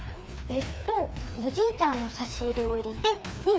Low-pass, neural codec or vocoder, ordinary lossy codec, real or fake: none; codec, 16 kHz, 2 kbps, FreqCodec, larger model; none; fake